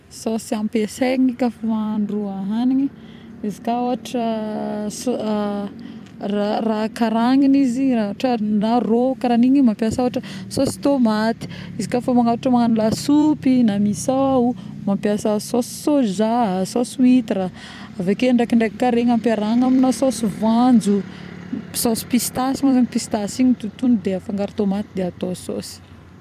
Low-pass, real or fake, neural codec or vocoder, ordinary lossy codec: 14.4 kHz; fake; vocoder, 44.1 kHz, 128 mel bands every 256 samples, BigVGAN v2; none